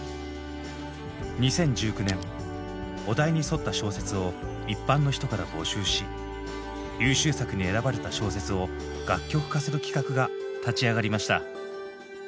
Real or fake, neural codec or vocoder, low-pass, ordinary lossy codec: real; none; none; none